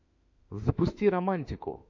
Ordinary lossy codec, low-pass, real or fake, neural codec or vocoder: AAC, 48 kbps; 7.2 kHz; fake; autoencoder, 48 kHz, 32 numbers a frame, DAC-VAE, trained on Japanese speech